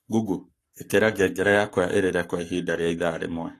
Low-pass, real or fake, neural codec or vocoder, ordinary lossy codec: 14.4 kHz; fake; codec, 44.1 kHz, 7.8 kbps, Pupu-Codec; AAC, 48 kbps